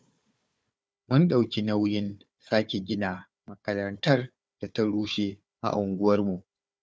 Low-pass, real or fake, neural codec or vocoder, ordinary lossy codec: none; fake; codec, 16 kHz, 4 kbps, FunCodec, trained on Chinese and English, 50 frames a second; none